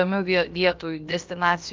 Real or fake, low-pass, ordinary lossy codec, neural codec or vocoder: fake; 7.2 kHz; Opus, 32 kbps; codec, 16 kHz, about 1 kbps, DyCAST, with the encoder's durations